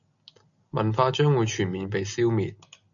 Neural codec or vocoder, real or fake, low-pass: none; real; 7.2 kHz